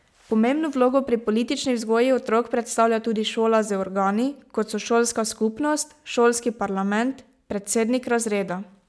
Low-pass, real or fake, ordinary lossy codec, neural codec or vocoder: none; real; none; none